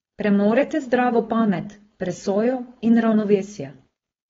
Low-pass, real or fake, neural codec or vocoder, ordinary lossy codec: 7.2 kHz; fake; codec, 16 kHz, 4.8 kbps, FACodec; AAC, 24 kbps